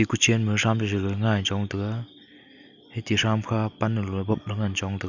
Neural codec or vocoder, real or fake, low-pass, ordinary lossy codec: none; real; 7.2 kHz; none